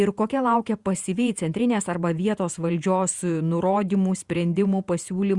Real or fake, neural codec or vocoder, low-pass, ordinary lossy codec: fake; vocoder, 48 kHz, 128 mel bands, Vocos; 10.8 kHz; Opus, 64 kbps